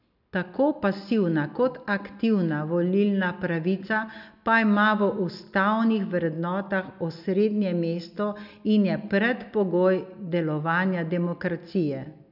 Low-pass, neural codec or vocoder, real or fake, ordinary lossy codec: 5.4 kHz; none; real; none